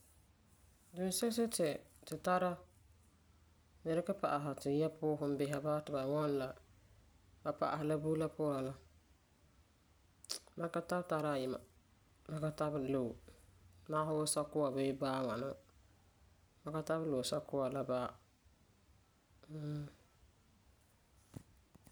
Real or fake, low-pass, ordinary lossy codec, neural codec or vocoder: real; none; none; none